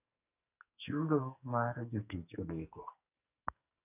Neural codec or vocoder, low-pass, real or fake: codec, 44.1 kHz, 2.6 kbps, SNAC; 3.6 kHz; fake